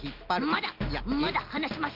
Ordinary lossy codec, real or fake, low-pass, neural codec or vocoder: Opus, 32 kbps; real; 5.4 kHz; none